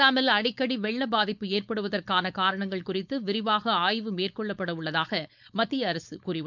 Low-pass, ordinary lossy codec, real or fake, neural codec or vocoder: 7.2 kHz; none; fake; codec, 16 kHz, 4.8 kbps, FACodec